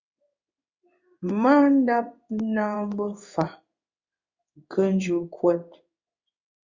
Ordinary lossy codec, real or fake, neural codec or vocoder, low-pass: Opus, 64 kbps; fake; codec, 16 kHz in and 24 kHz out, 1 kbps, XY-Tokenizer; 7.2 kHz